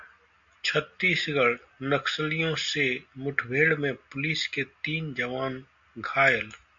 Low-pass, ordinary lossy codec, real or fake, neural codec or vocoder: 7.2 kHz; AAC, 64 kbps; real; none